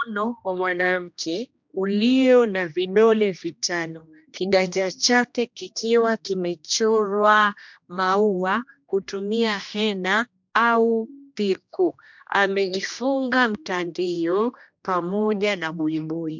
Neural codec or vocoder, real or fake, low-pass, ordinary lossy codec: codec, 16 kHz, 1 kbps, X-Codec, HuBERT features, trained on general audio; fake; 7.2 kHz; MP3, 64 kbps